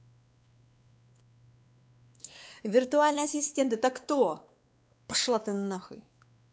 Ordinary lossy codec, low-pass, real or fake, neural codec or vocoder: none; none; fake; codec, 16 kHz, 2 kbps, X-Codec, WavLM features, trained on Multilingual LibriSpeech